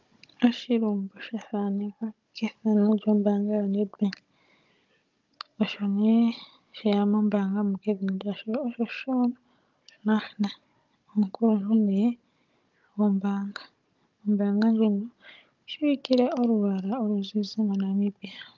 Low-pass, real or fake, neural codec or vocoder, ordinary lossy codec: 7.2 kHz; fake; codec, 16 kHz, 16 kbps, FunCodec, trained on Chinese and English, 50 frames a second; Opus, 24 kbps